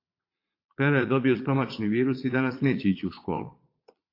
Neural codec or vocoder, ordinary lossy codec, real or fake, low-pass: vocoder, 22.05 kHz, 80 mel bands, Vocos; AAC, 32 kbps; fake; 5.4 kHz